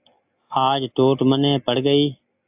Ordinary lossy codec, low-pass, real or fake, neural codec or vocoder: AAC, 32 kbps; 3.6 kHz; real; none